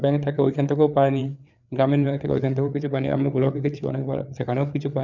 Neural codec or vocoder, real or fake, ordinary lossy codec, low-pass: codec, 16 kHz, 4 kbps, FreqCodec, larger model; fake; none; 7.2 kHz